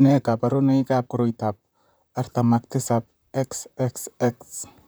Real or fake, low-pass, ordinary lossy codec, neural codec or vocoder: fake; none; none; vocoder, 44.1 kHz, 128 mel bands, Pupu-Vocoder